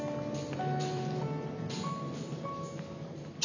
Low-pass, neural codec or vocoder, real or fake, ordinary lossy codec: 7.2 kHz; none; real; none